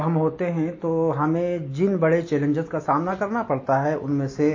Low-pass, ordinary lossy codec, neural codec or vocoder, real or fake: 7.2 kHz; MP3, 32 kbps; none; real